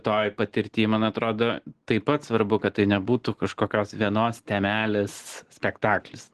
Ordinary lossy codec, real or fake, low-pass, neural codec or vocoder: Opus, 16 kbps; real; 10.8 kHz; none